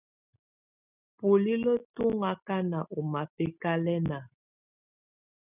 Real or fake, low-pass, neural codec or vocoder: real; 3.6 kHz; none